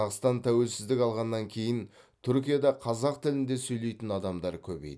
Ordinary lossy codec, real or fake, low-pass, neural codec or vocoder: none; real; none; none